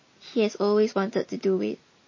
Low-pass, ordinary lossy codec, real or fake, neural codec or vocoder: 7.2 kHz; MP3, 32 kbps; real; none